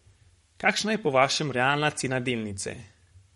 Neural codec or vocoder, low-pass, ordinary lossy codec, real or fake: codec, 44.1 kHz, 7.8 kbps, Pupu-Codec; 19.8 kHz; MP3, 48 kbps; fake